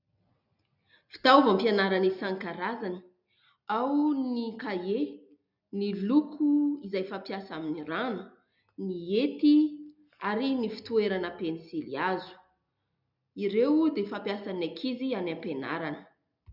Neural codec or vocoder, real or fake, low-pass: none; real; 5.4 kHz